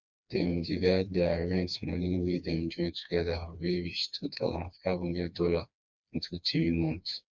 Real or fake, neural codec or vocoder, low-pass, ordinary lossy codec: fake; codec, 16 kHz, 2 kbps, FreqCodec, smaller model; 7.2 kHz; none